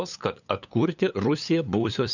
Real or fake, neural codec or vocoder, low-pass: fake; codec, 16 kHz, 8 kbps, FunCodec, trained on LibriTTS, 25 frames a second; 7.2 kHz